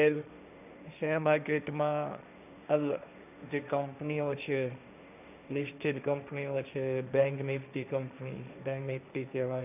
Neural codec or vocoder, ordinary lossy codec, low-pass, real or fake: codec, 16 kHz, 1.1 kbps, Voila-Tokenizer; none; 3.6 kHz; fake